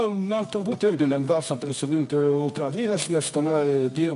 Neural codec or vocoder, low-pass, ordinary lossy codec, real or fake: codec, 24 kHz, 0.9 kbps, WavTokenizer, medium music audio release; 10.8 kHz; MP3, 64 kbps; fake